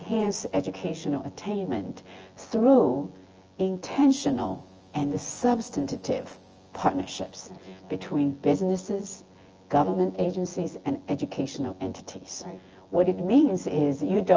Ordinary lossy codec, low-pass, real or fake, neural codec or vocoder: Opus, 24 kbps; 7.2 kHz; fake; vocoder, 24 kHz, 100 mel bands, Vocos